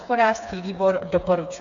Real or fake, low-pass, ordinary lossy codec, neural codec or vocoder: fake; 7.2 kHz; AAC, 64 kbps; codec, 16 kHz, 4 kbps, FreqCodec, smaller model